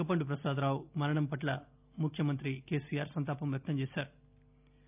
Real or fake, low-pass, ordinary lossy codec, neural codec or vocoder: real; 3.6 kHz; none; none